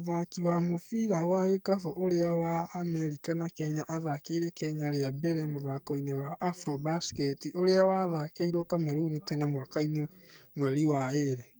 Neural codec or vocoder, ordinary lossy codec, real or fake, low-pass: codec, 44.1 kHz, 2.6 kbps, SNAC; none; fake; none